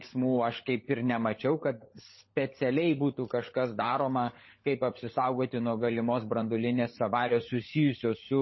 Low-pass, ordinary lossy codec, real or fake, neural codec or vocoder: 7.2 kHz; MP3, 24 kbps; fake; codec, 16 kHz, 16 kbps, FunCodec, trained on LibriTTS, 50 frames a second